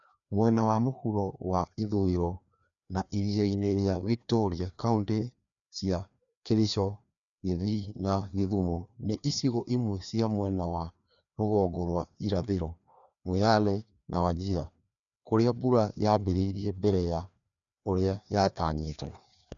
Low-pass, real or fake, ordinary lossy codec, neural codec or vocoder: 7.2 kHz; fake; none; codec, 16 kHz, 2 kbps, FreqCodec, larger model